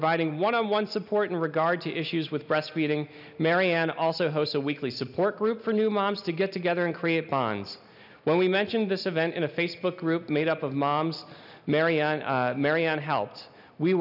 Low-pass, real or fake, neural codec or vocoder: 5.4 kHz; real; none